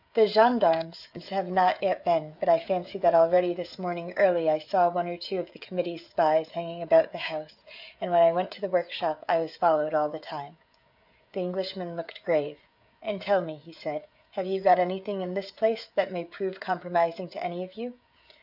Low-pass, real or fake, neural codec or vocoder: 5.4 kHz; fake; codec, 16 kHz, 16 kbps, FreqCodec, smaller model